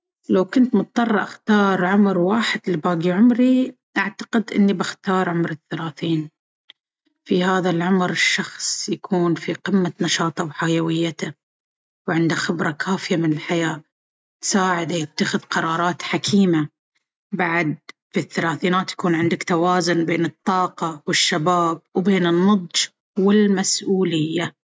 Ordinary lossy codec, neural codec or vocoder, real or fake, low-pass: none; none; real; none